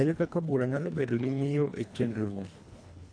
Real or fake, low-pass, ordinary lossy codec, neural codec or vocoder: fake; 9.9 kHz; none; codec, 24 kHz, 1.5 kbps, HILCodec